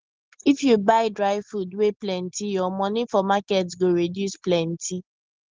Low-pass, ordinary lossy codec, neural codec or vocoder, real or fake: 7.2 kHz; Opus, 16 kbps; none; real